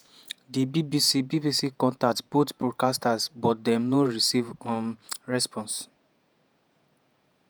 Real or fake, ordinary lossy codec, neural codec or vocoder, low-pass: fake; none; vocoder, 48 kHz, 128 mel bands, Vocos; none